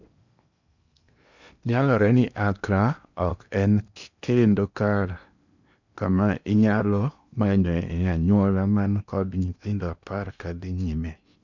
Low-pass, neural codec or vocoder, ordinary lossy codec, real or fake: 7.2 kHz; codec, 16 kHz in and 24 kHz out, 0.8 kbps, FocalCodec, streaming, 65536 codes; none; fake